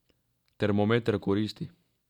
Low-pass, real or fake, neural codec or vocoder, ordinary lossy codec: 19.8 kHz; real; none; none